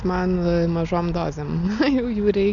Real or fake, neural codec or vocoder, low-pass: real; none; 7.2 kHz